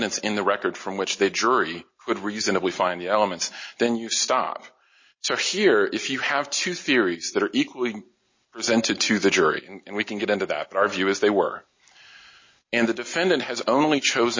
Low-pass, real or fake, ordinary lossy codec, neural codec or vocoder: 7.2 kHz; real; MP3, 32 kbps; none